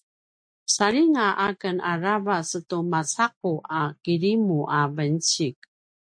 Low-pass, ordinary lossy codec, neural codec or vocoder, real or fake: 9.9 kHz; MP3, 48 kbps; none; real